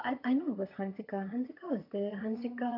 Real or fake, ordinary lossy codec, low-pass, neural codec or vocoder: fake; MP3, 48 kbps; 5.4 kHz; vocoder, 22.05 kHz, 80 mel bands, HiFi-GAN